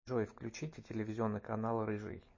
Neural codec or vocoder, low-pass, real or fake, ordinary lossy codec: none; 7.2 kHz; real; MP3, 32 kbps